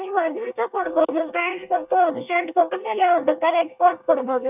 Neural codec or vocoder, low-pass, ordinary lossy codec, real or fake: codec, 24 kHz, 1 kbps, SNAC; 3.6 kHz; none; fake